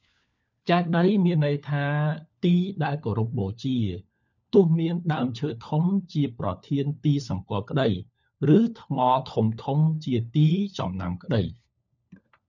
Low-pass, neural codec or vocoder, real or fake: 7.2 kHz; codec, 16 kHz, 4 kbps, FunCodec, trained on LibriTTS, 50 frames a second; fake